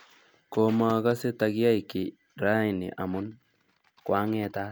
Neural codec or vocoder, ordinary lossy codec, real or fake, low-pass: none; none; real; none